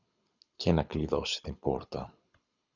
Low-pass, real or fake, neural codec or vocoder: 7.2 kHz; fake; codec, 24 kHz, 6 kbps, HILCodec